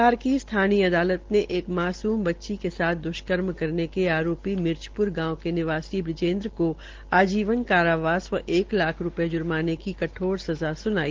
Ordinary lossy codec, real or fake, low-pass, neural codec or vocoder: Opus, 16 kbps; real; 7.2 kHz; none